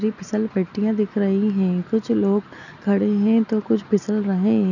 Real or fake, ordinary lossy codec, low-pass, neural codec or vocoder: real; none; 7.2 kHz; none